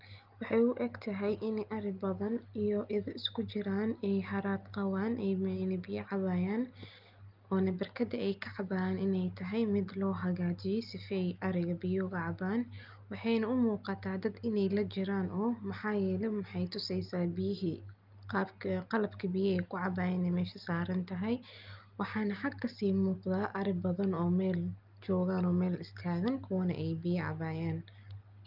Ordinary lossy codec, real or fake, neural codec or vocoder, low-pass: Opus, 24 kbps; real; none; 5.4 kHz